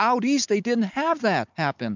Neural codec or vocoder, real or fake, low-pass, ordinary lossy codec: none; real; 7.2 kHz; MP3, 64 kbps